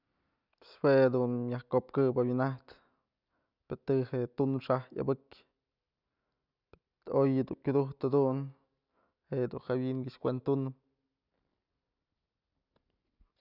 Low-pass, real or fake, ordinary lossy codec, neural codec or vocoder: 5.4 kHz; real; none; none